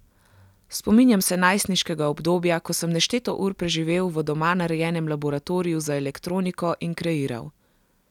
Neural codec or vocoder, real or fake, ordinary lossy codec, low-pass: none; real; none; 19.8 kHz